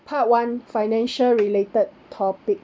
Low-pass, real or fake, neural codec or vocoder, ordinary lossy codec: none; real; none; none